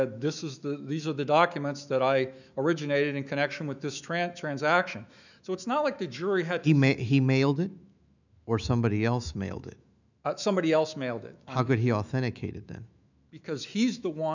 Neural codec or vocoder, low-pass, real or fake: autoencoder, 48 kHz, 128 numbers a frame, DAC-VAE, trained on Japanese speech; 7.2 kHz; fake